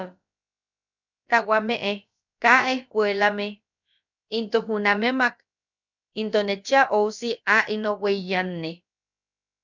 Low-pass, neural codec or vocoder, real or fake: 7.2 kHz; codec, 16 kHz, about 1 kbps, DyCAST, with the encoder's durations; fake